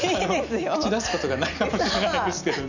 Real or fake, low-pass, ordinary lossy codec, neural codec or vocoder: real; 7.2 kHz; none; none